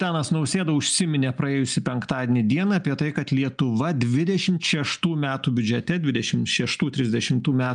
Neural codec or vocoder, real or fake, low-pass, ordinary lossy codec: none; real; 9.9 kHz; MP3, 96 kbps